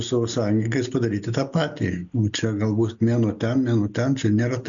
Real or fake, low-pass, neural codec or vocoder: real; 7.2 kHz; none